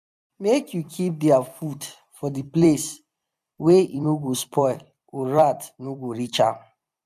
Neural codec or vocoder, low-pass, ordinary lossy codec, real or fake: vocoder, 44.1 kHz, 128 mel bands every 256 samples, BigVGAN v2; 14.4 kHz; none; fake